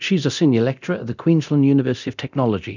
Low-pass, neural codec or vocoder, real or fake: 7.2 kHz; codec, 24 kHz, 0.9 kbps, DualCodec; fake